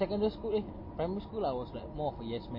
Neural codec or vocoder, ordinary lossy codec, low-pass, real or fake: none; MP3, 24 kbps; 5.4 kHz; real